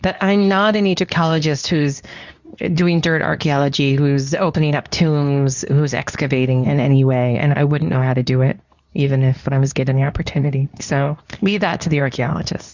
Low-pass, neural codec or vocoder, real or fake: 7.2 kHz; codec, 24 kHz, 0.9 kbps, WavTokenizer, medium speech release version 2; fake